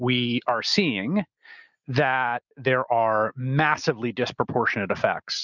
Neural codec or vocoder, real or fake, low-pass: none; real; 7.2 kHz